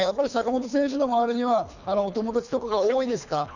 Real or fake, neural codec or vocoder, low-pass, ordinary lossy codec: fake; codec, 24 kHz, 3 kbps, HILCodec; 7.2 kHz; none